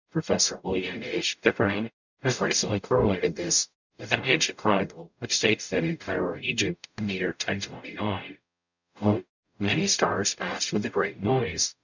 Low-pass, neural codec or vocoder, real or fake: 7.2 kHz; codec, 44.1 kHz, 0.9 kbps, DAC; fake